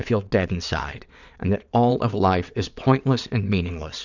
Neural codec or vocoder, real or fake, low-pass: vocoder, 22.05 kHz, 80 mel bands, WaveNeXt; fake; 7.2 kHz